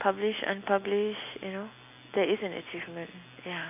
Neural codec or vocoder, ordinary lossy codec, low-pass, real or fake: none; AAC, 32 kbps; 3.6 kHz; real